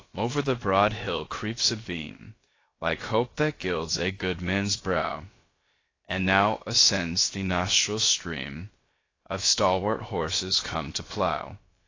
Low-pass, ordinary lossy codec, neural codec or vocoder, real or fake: 7.2 kHz; AAC, 32 kbps; codec, 16 kHz, about 1 kbps, DyCAST, with the encoder's durations; fake